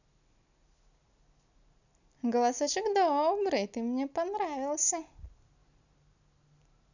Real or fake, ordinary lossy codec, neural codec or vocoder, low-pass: real; none; none; 7.2 kHz